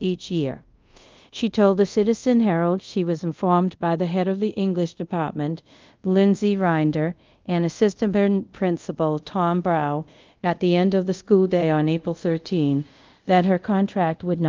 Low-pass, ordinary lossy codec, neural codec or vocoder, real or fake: 7.2 kHz; Opus, 24 kbps; codec, 24 kHz, 0.5 kbps, DualCodec; fake